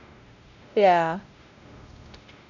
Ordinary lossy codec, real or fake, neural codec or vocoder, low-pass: none; fake; codec, 16 kHz, 0.5 kbps, X-Codec, WavLM features, trained on Multilingual LibriSpeech; 7.2 kHz